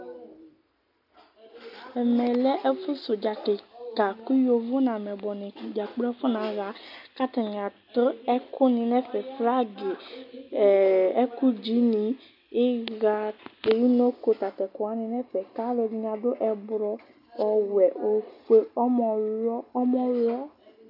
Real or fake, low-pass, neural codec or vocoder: real; 5.4 kHz; none